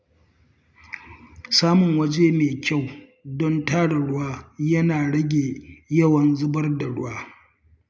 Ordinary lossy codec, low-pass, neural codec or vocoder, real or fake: none; none; none; real